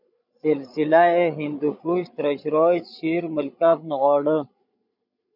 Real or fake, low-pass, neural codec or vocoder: fake; 5.4 kHz; codec, 16 kHz, 16 kbps, FreqCodec, larger model